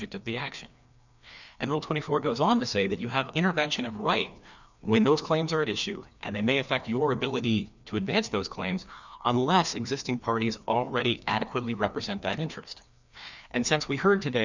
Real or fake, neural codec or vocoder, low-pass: fake; codec, 16 kHz, 2 kbps, FreqCodec, larger model; 7.2 kHz